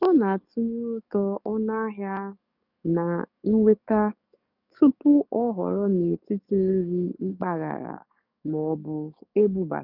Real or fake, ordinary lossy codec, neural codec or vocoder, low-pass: fake; none; codec, 44.1 kHz, 7.8 kbps, DAC; 5.4 kHz